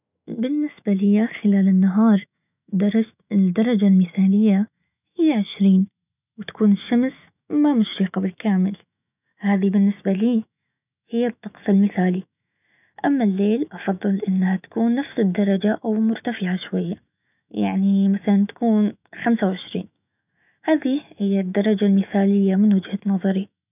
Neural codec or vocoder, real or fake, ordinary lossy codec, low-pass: autoencoder, 48 kHz, 128 numbers a frame, DAC-VAE, trained on Japanese speech; fake; none; 3.6 kHz